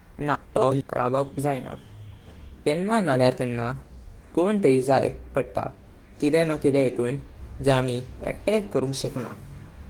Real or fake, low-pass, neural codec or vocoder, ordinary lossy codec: fake; 19.8 kHz; codec, 44.1 kHz, 2.6 kbps, DAC; Opus, 24 kbps